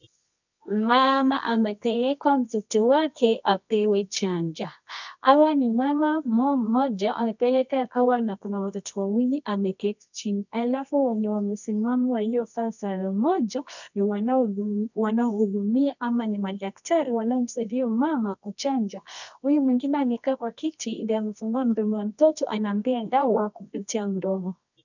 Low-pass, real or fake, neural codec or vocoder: 7.2 kHz; fake; codec, 24 kHz, 0.9 kbps, WavTokenizer, medium music audio release